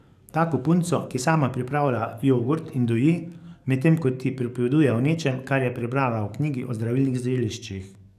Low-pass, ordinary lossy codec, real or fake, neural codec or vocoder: 14.4 kHz; none; fake; codec, 44.1 kHz, 7.8 kbps, DAC